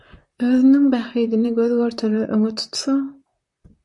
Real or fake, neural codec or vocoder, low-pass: fake; vocoder, 44.1 kHz, 128 mel bands, Pupu-Vocoder; 10.8 kHz